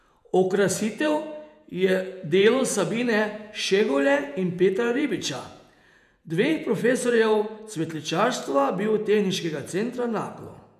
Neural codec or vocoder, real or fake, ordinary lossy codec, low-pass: vocoder, 48 kHz, 128 mel bands, Vocos; fake; none; 14.4 kHz